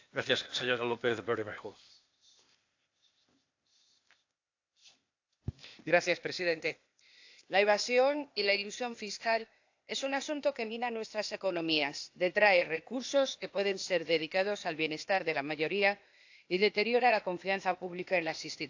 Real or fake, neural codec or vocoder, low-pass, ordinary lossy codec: fake; codec, 16 kHz, 0.8 kbps, ZipCodec; 7.2 kHz; AAC, 48 kbps